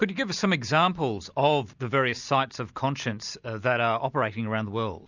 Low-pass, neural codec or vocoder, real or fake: 7.2 kHz; none; real